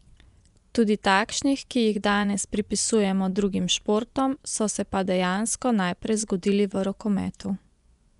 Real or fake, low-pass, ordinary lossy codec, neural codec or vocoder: fake; 10.8 kHz; none; vocoder, 24 kHz, 100 mel bands, Vocos